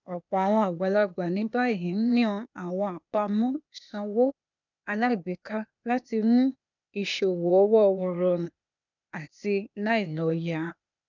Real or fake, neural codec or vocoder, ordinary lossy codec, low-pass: fake; codec, 16 kHz, 0.8 kbps, ZipCodec; none; 7.2 kHz